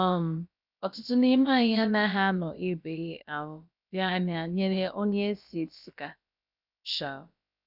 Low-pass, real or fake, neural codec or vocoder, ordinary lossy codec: 5.4 kHz; fake; codec, 16 kHz, about 1 kbps, DyCAST, with the encoder's durations; none